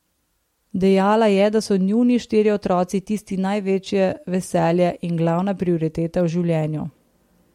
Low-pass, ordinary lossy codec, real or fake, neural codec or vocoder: 19.8 kHz; MP3, 64 kbps; real; none